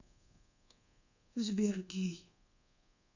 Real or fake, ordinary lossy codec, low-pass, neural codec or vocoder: fake; none; 7.2 kHz; codec, 24 kHz, 1.2 kbps, DualCodec